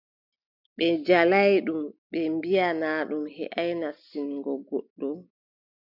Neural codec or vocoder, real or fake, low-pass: none; real; 5.4 kHz